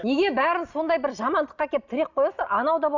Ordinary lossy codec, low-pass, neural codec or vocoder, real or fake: Opus, 64 kbps; 7.2 kHz; none; real